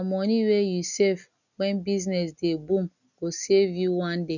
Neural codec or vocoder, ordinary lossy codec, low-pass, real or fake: none; none; 7.2 kHz; real